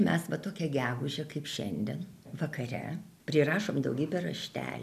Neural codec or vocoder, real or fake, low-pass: none; real; 14.4 kHz